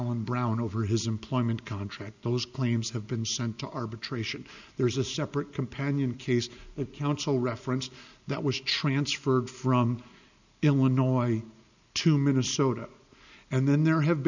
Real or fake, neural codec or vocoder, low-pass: real; none; 7.2 kHz